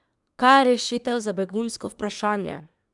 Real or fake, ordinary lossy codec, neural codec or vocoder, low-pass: fake; none; codec, 24 kHz, 1 kbps, SNAC; 10.8 kHz